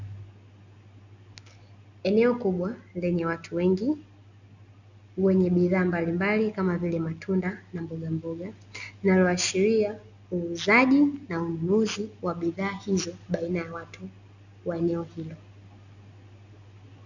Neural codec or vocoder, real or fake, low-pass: none; real; 7.2 kHz